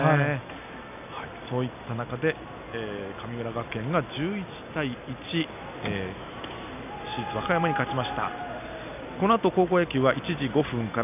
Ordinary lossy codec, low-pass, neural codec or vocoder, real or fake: none; 3.6 kHz; none; real